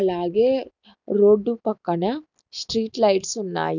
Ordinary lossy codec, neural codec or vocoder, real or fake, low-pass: none; none; real; 7.2 kHz